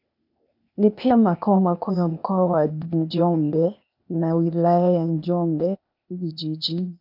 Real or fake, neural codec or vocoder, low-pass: fake; codec, 16 kHz, 0.8 kbps, ZipCodec; 5.4 kHz